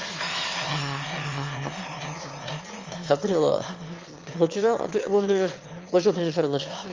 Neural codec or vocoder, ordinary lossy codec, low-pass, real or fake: autoencoder, 22.05 kHz, a latent of 192 numbers a frame, VITS, trained on one speaker; Opus, 32 kbps; 7.2 kHz; fake